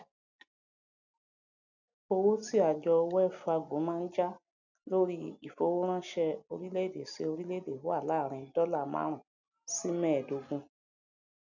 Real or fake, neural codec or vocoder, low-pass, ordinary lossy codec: real; none; 7.2 kHz; none